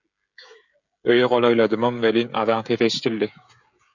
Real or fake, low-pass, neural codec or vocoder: fake; 7.2 kHz; codec, 16 kHz, 16 kbps, FreqCodec, smaller model